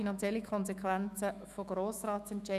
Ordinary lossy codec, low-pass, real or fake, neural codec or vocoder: none; 14.4 kHz; fake; autoencoder, 48 kHz, 128 numbers a frame, DAC-VAE, trained on Japanese speech